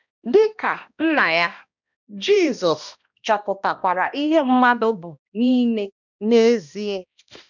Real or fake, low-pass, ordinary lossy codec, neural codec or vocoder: fake; 7.2 kHz; none; codec, 16 kHz, 1 kbps, X-Codec, HuBERT features, trained on balanced general audio